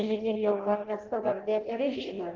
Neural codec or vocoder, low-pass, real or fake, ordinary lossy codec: codec, 16 kHz in and 24 kHz out, 0.6 kbps, FireRedTTS-2 codec; 7.2 kHz; fake; Opus, 16 kbps